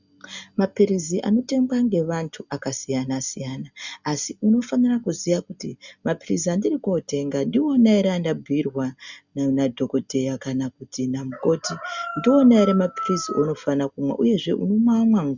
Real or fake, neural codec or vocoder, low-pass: real; none; 7.2 kHz